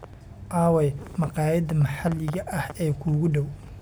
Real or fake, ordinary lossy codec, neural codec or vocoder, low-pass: real; none; none; none